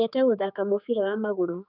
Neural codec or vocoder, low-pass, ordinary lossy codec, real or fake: codec, 16 kHz, 4 kbps, X-Codec, HuBERT features, trained on general audio; 5.4 kHz; none; fake